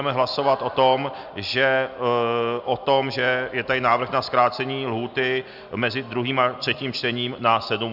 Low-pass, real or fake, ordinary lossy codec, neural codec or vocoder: 5.4 kHz; real; Opus, 64 kbps; none